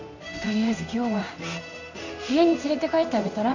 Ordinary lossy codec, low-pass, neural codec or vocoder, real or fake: none; 7.2 kHz; codec, 16 kHz in and 24 kHz out, 1 kbps, XY-Tokenizer; fake